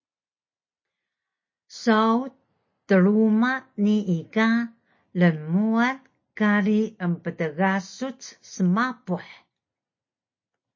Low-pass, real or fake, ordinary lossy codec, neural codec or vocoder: 7.2 kHz; real; MP3, 32 kbps; none